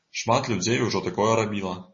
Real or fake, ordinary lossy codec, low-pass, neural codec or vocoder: real; MP3, 32 kbps; 7.2 kHz; none